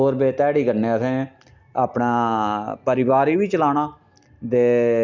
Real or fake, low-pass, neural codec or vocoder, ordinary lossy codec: real; 7.2 kHz; none; none